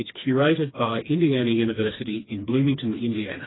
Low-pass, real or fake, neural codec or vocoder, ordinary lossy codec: 7.2 kHz; fake; codec, 16 kHz, 2 kbps, FreqCodec, smaller model; AAC, 16 kbps